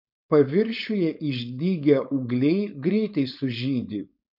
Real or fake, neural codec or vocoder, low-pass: fake; codec, 16 kHz, 4.8 kbps, FACodec; 5.4 kHz